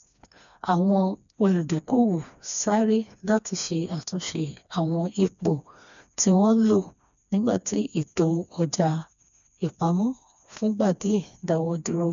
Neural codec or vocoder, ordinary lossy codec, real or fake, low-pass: codec, 16 kHz, 2 kbps, FreqCodec, smaller model; none; fake; 7.2 kHz